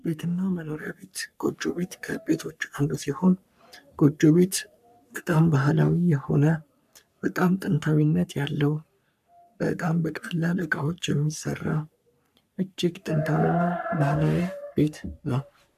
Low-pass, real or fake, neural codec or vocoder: 14.4 kHz; fake; codec, 44.1 kHz, 3.4 kbps, Pupu-Codec